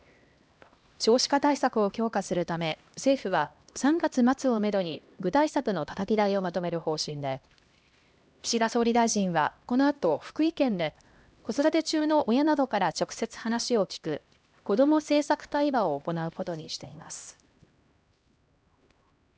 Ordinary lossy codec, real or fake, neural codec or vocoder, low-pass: none; fake; codec, 16 kHz, 1 kbps, X-Codec, HuBERT features, trained on LibriSpeech; none